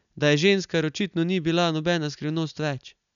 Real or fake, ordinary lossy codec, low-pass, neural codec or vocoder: real; none; 7.2 kHz; none